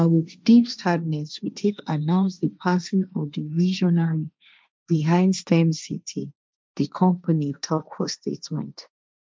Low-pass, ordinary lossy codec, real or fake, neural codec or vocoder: none; none; fake; codec, 16 kHz, 1.1 kbps, Voila-Tokenizer